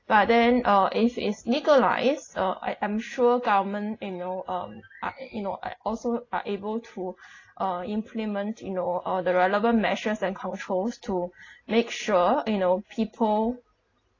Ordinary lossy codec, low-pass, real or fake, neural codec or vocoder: AAC, 32 kbps; 7.2 kHz; real; none